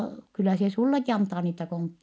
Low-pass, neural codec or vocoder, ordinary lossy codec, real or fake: none; none; none; real